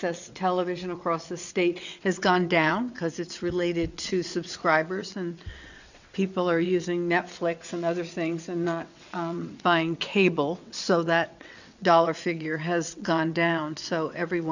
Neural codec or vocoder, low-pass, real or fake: vocoder, 22.05 kHz, 80 mel bands, WaveNeXt; 7.2 kHz; fake